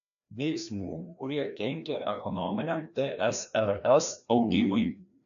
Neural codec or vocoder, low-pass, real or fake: codec, 16 kHz, 1 kbps, FreqCodec, larger model; 7.2 kHz; fake